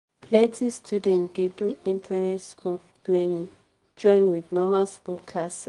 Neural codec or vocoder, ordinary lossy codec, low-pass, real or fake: codec, 24 kHz, 0.9 kbps, WavTokenizer, medium music audio release; Opus, 32 kbps; 10.8 kHz; fake